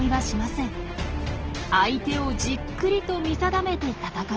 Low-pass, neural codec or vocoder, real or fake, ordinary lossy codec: 7.2 kHz; none; real; Opus, 16 kbps